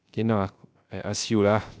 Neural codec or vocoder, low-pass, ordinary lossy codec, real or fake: codec, 16 kHz, 0.3 kbps, FocalCodec; none; none; fake